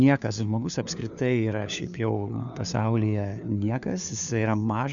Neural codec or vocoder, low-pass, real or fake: codec, 16 kHz, 4 kbps, FunCodec, trained on LibriTTS, 50 frames a second; 7.2 kHz; fake